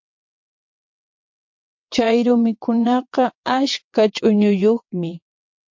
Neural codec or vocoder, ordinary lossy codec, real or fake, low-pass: vocoder, 22.05 kHz, 80 mel bands, WaveNeXt; MP3, 48 kbps; fake; 7.2 kHz